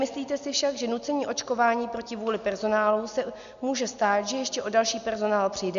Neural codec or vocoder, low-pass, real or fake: none; 7.2 kHz; real